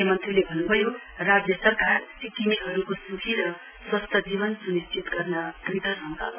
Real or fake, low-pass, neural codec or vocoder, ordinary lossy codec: real; 3.6 kHz; none; none